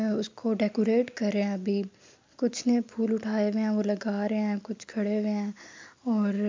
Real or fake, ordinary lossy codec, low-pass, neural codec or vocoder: real; MP3, 64 kbps; 7.2 kHz; none